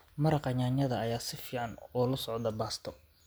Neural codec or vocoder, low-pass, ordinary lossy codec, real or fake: none; none; none; real